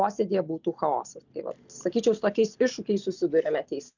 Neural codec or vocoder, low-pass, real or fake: none; 7.2 kHz; real